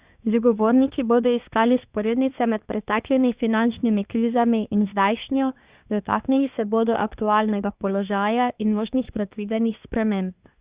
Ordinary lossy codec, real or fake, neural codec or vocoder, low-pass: Opus, 64 kbps; fake; codec, 24 kHz, 1 kbps, SNAC; 3.6 kHz